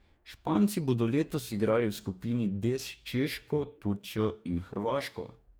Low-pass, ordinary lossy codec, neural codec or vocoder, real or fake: none; none; codec, 44.1 kHz, 2.6 kbps, DAC; fake